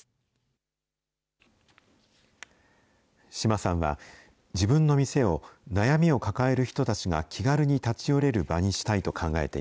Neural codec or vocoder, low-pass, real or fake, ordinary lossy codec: none; none; real; none